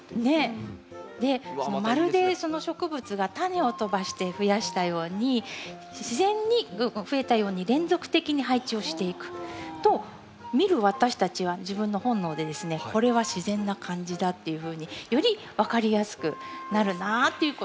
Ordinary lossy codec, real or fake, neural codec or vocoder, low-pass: none; real; none; none